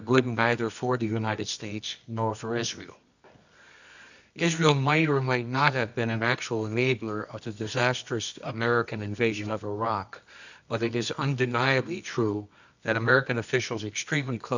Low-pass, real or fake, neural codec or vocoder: 7.2 kHz; fake; codec, 24 kHz, 0.9 kbps, WavTokenizer, medium music audio release